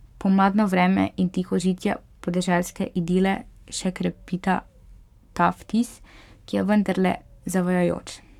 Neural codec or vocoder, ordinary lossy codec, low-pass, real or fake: codec, 44.1 kHz, 7.8 kbps, Pupu-Codec; none; 19.8 kHz; fake